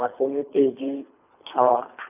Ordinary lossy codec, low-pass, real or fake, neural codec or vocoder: none; 3.6 kHz; fake; codec, 24 kHz, 3 kbps, HILCodec